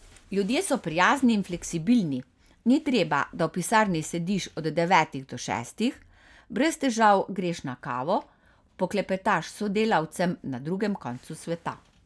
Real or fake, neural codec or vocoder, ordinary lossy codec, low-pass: real; none; none; none